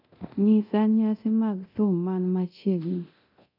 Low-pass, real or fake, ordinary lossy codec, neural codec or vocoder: 5.4 kHz; fake; none; codec, 24 kHz, 0.9 kbps, DualCodec